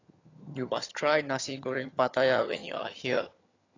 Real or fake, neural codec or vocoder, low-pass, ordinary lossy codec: fake; vocoder, 22.05 kHz, 80 mel bands, HiFi-GAN; 7.2 kHz; AAC, 32 kbps